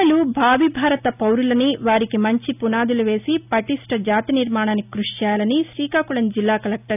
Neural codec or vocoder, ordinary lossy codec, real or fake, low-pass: none; none; real; 3.6 kHz